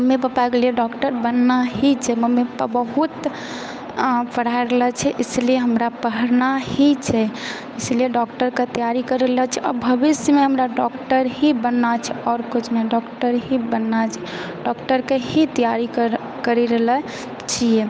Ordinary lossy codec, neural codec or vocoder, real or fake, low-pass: none; codec, 16 kHz, 8 kbps, FunCodec, trained on Chinese and English, 25 frames a second; fake; none